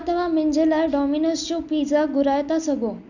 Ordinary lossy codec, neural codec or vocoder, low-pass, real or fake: none; none; 7.2 kHz; real